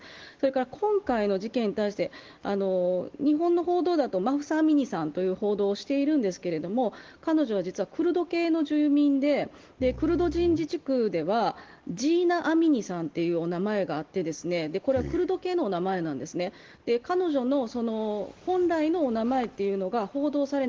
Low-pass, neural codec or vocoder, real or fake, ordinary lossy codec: 7.2 kHz; none; real; Opus, 16 kbps